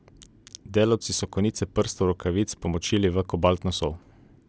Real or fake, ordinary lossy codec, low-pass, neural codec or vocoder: real; none; none; none